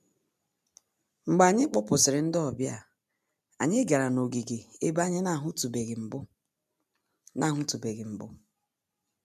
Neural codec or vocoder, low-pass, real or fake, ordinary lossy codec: none; 14.4 kHz; real; none